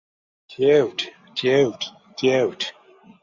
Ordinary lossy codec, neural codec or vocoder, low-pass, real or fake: Opus, 64 kbps; none; 7.2 kHz; real